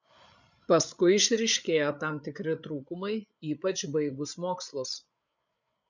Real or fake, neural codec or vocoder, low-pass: fake; codec, 16 kHz, 8 kbps, FreqCodec, larger model; 7.2 kHz